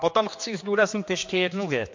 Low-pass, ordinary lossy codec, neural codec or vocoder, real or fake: 7.2 kHz; MP3, 48 kbps; codec, 16 kHz, 2 kbps, X-Codec, HuBERT features, trained on general audio; fake